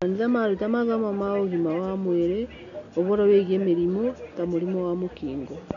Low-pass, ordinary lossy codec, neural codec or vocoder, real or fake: 7.2 kHz; none; none; real